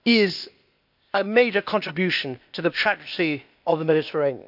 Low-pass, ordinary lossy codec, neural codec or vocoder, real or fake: 5.4 kHz; none; codec, 16 kHz, 0.8 kbps, ZipCodec; fake